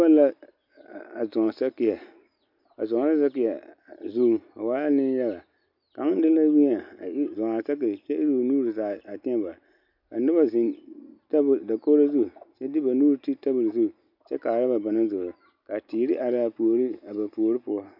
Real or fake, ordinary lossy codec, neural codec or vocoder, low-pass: real; MP3, 48 kbps; none; 5.4 kHz